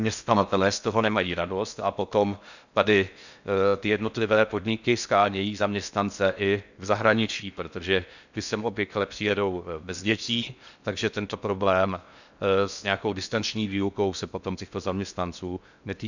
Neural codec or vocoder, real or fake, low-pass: codec, 16 kHz in and 24 kHz out, 0.6 kbps, FocalCodec, streaming, 4096 codes; fake; 7.2 kHz